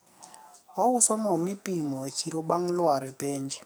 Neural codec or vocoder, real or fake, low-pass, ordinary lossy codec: codec, 44.1 kHz, 2.6 kbps, SNAC; fake; none; none